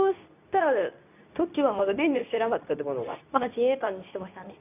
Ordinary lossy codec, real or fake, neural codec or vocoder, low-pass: none; fake; codec, 24 kHz, 0.9 kbps, WavTokenizer, medium speech release version 2; 3.6 kHz